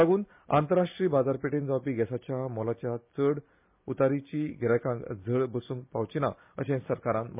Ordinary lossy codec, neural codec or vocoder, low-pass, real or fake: MP3, 32 kbps; none; 3.6 kHz; real